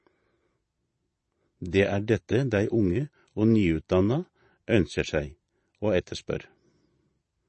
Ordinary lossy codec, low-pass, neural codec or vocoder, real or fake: MP3, 32 kbps; 9.9 kHz; vocoder, 22.05 kHz, 80 mel bands, Vocos; fake